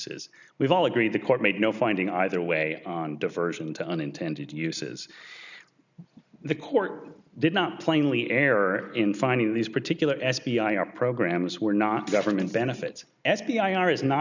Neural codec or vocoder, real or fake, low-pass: none; real; 7.2 kHz